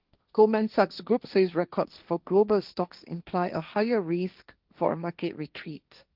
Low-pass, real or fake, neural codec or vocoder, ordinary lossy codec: 5.4 kHz; fake; codec, 16 kHz, 1.1 kbps, Voila-Tokenizer; Opus, 24 kbps